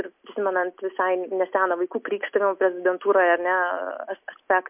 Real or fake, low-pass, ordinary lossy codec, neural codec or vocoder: real; 3.6 kHz; MP3, 32 kbps; none